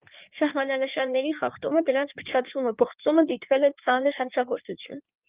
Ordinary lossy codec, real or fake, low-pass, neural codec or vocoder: Opus, 24 kbps; fake; 3.6 kHz; codec, 16 kHz in and 24 kHz out, 1.1 kbps, FireRedTTS-2 codec